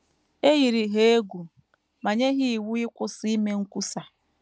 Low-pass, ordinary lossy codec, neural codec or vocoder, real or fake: none; none; none; real